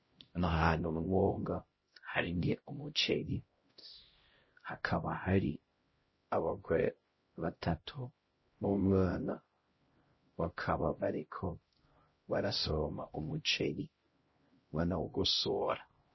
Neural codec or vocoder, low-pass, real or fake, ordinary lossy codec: codec, 16 kHz, 0.5 kbps, X-Codec, HuBERT features, trained on LibriSpeech; 7.2 kHz; fake; MP3, 24 kbps